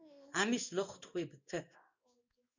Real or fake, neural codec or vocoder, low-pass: fake; codec, 16 kHz in and 24 kHz out, 1 kbps, XY-Tokenizer; 7.2 kHz